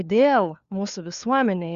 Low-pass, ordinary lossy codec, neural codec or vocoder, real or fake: 7.2 kHz; Opus, 64 kbps; codec, 16 kHz, 4 kbps, FunCodec, trained on LibriTTS, 50 frames a second; fake